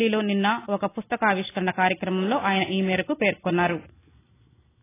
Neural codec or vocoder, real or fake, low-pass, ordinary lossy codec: none; real; 3.6 kHz; AAC, 16 kbps